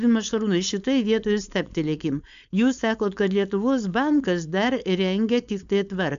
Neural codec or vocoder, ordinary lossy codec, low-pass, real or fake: codec, 16 kHz, 4.8 kbps, FACodec; AAC, 96 kbps; 7.2 kHz; fake